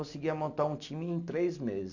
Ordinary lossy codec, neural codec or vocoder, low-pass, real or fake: none; none; 7.2 kHz; real